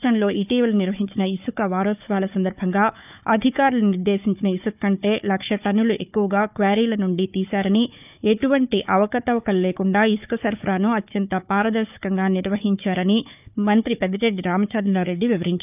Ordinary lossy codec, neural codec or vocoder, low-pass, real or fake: none; codec, 16 kHz, 4 kbps, FunCodec, trained on Chinese and English, 50 frames a second; 3.6 kHz; fake